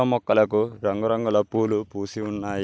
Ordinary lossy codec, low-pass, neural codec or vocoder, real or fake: none; none; none; real